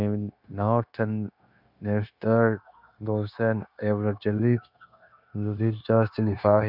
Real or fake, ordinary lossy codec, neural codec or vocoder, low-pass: fake; none; codec, 16 kHz, 0.9 kbps, LongCat-Audio-Codec; 5.4 kHz